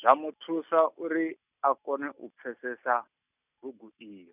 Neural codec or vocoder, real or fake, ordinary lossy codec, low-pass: none; real; none; 3.6 kHz